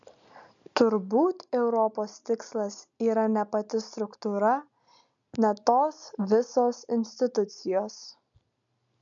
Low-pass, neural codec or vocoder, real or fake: 7.2 kHz; none; real